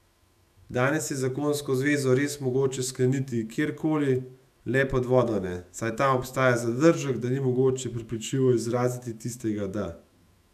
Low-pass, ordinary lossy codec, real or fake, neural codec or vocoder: 14.4 kHz; none; fake; autoencoder, 48 kHz, 128 numbers a frame, DAC-VAE, trained on Japanese speech